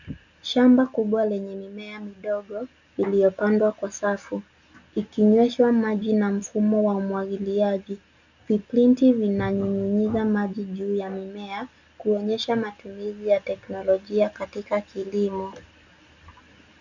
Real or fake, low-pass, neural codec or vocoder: real; 7.2 kHz; none